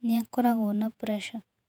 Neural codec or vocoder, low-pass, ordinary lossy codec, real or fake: vocoder, 48 kHz, 128 mel bands, Vocos; 19.8 kHz; none; fake